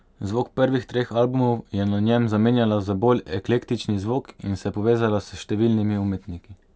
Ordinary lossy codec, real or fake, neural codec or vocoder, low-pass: none; real; none; none